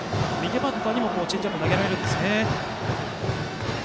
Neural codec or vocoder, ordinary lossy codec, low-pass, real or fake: none; none; none; real